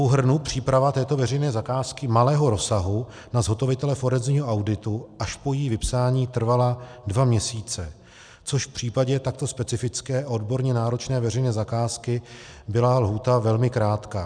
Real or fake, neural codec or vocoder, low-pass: real; none; 9.9 kHz